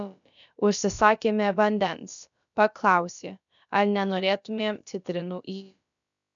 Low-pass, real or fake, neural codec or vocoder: 7.2 kHz; fake; codec, 16 kHz, about 1 kbps, DyCAST, with the encoder's durations